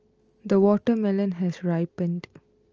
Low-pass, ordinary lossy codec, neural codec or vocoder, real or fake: 7.2 kHz; Opus, 24 kbps; none; real